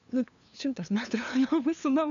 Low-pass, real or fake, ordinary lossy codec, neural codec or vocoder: 7.2 kHz; fake; AAC, 96 kbps; codec, 16 kHz, 2 kbps, FunCodec, trained on LibriTTS, 25 frames a second